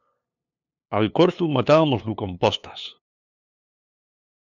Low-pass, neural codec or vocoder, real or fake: 7.2 kHz; codec, 16 kHz, 2 kbps, FunCodec, trained on LibriTTS, 25 frames a second; fake